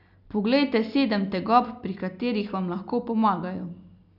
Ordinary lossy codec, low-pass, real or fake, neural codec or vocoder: none; 5.4 kHz; real; none